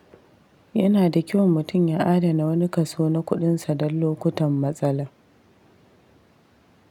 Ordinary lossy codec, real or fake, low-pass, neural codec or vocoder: none; real; 19.8 kHz; none